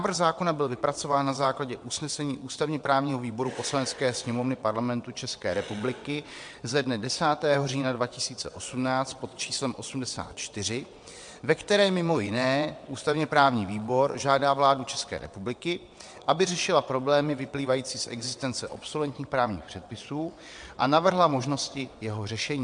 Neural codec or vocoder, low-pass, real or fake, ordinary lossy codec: vocoder, 22.05 kHz, 80 mel bands, WaveNeXt; 9.9 kHz; fake; MP3, 64 kbps